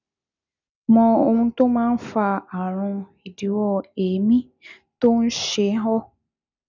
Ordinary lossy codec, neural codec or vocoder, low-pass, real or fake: none; none; 7.2 kHz; real